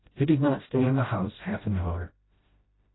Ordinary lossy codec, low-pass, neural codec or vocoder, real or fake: AAC, 16 kbps; 7.2 kHz; codec, 16 kHz, 0.5 kbps, FreqCodec, smaller model; fake